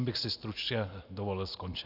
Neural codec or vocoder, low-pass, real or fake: none; 5.4 kHz; real